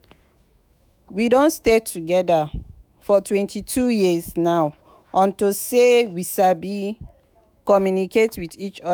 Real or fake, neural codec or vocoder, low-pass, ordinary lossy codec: fake; autoencoder, 48 kHz, 128 numbers a frame, DAC-VAE, trained on Japanese speech; none; none